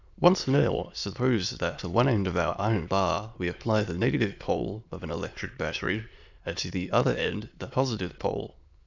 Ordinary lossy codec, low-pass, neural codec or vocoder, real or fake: Opus, 64 kbps; 7.2 kHz; autoencoder, 22.05 kHz, a latent of 192 numbers a frame, VITS, trained on many speakers; fake